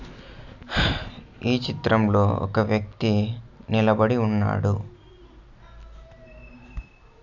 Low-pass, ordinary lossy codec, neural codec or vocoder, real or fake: 7.2 kHz; none; none; real